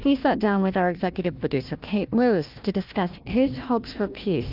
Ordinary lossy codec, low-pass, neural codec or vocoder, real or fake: Opus, 24 kbps; 5.4 kHz; codec, 16 kHz, 1 kbps, FunCodec, trained on Chinese and English, 50 frames a second; fake